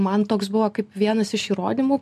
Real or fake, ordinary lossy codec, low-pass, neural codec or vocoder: real; MP3, 64 kbps; 14.4 kHz; none